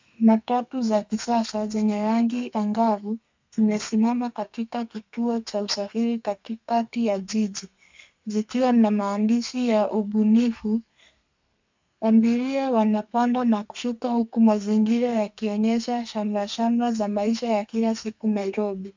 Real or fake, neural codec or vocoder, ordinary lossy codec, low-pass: fake; codec, 32 kHz, 1.9 kbps, SNAC; MP3, 64 kbps; 7.2 kHz